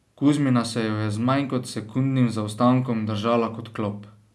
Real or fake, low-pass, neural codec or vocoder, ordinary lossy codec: real; none; none; none